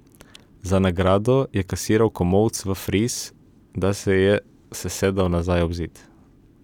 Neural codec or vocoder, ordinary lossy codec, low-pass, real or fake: vocoder, 44.1 kHz, 128 mel bands every 256 samples, BigVGAN v2; none; 19.8 kHz; fake